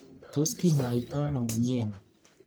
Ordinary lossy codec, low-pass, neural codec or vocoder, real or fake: none; none; codec, 44.1 kHz, 1.7 kbps, Pupu-Codec; fake